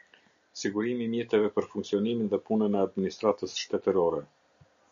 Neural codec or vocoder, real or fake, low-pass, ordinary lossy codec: none; real; 7.2 kHz; MP3, 64 kbps